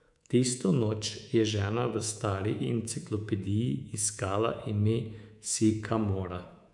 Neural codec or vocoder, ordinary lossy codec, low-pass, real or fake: codec, 24 kHz, 3.1 kbps, DualCodec; none; 10.8 kHz; fake